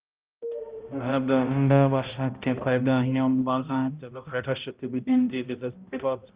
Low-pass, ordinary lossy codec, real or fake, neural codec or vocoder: 3.6 kHz; Opus, 24 kbps; fake; codec, 16 kHz, 0.5 kbps, X-Codec, HuBERT features, trained on balanced general audio